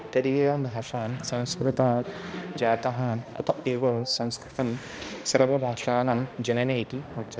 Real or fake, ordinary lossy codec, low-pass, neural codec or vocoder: fake; none; none; codec, 16 kHz, 1 kbps, X-Codec, HuBERT features, trained on balanced general audio